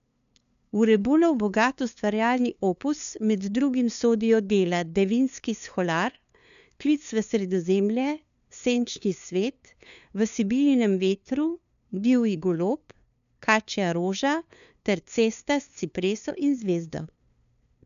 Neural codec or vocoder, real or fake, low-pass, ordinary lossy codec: codec, 16 kHz, 2 kbps, FunCodec, trained on LibriTTS, 25 frames a second; fake; 7.2 kHz; none